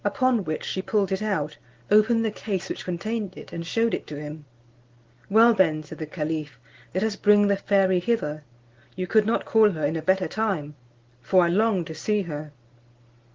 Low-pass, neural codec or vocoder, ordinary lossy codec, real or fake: 7.2 kHz; none; Opus, 16 kbps; real